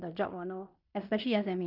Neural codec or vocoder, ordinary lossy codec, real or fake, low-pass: codec, 16 kHz in and 24 kHz out, 0.9 kbps, LongCat-Audio-Codec, fine tuned four codebook decoder; none; fake; 5.4 kHz